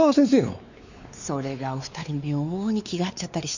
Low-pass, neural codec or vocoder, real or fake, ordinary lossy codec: 7.2 kHz; codec, 16 kHz, 4 kbps, X-Codec, WavLM features, trained on Multilingual LibriSpeech; fake; none